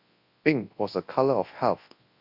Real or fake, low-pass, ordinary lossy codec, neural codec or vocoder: fake; 5.4 kHz; AAC, 32 kbps; codec, 24 kHz, 0.9 kbps, WavTokenizer, large speech release